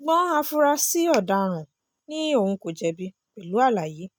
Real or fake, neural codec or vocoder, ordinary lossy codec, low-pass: real; none; none; none